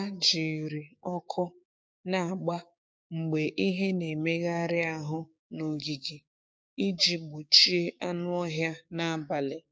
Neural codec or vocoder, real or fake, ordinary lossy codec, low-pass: codec, 16 kHz, 6 kbps, DAC; fake; none; none